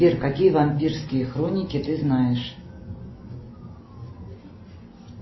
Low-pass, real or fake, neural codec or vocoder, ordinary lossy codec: 7.2 kHz; real; none; MP3, 24 kbps